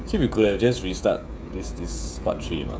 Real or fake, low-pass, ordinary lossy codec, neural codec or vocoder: fake; none; none; codec, 16 kHz, 16 kbps, FreqCodec, smaller model